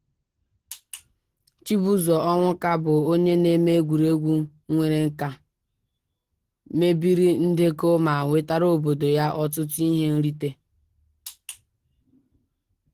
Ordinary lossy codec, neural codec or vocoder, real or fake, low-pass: Opus, 16 kbps; none; real; 14.4 kHz